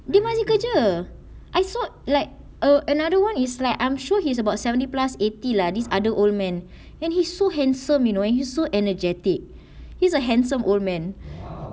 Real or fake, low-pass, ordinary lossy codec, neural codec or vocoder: real; none; none; none